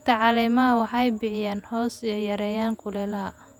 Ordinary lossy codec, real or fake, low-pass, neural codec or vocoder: none; fake; 19.8 kHz; vocoder, 48 kHz, 128 mel bands, Vocos